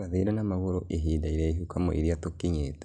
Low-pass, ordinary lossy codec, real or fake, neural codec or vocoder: 9.9 kHz; none; real; none